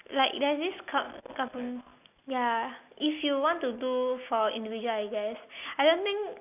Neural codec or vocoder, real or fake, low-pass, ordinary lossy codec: none; real; 3.6 kHz; none